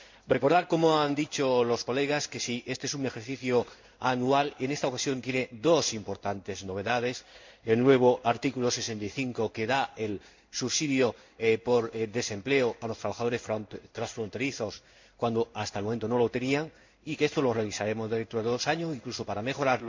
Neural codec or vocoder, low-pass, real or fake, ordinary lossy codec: codec, 16 kHz in and 24 kHz out, 1 kbps, XY-Tokenizer; 7.2 kHz; fake; MP3, 64 kbps